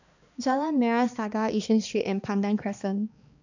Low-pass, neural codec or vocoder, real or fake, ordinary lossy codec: 7.2 kHz; codec, 16 kHz, 2 kbps, X-Codec, HuBERT features, trained on balanced general audio; fake; none